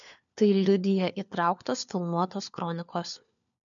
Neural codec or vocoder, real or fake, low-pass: codec, 16 kHz, 4 kbps, FunCodec, trained on LibriTTS, 50 frames a second; fake; 7.2 kHz